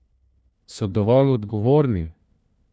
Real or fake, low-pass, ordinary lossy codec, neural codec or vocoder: fake; none; none; codec, 16 kHz, 1 kbps, FunCodec, trained on LibriTTS, 50 frames a second